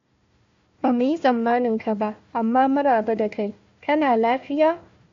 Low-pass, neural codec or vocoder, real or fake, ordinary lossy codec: 7.2 kHz; codec, 16 kHz, 1 kbps, FunCodec, trained on Chinese and English, 50 frames a second; fake; AAC, 48 kbps